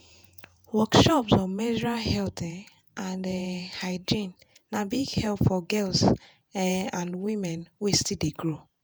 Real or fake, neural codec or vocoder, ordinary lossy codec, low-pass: fake; vocoder, 48 kHz, 128 mel bands, Vocos; none; none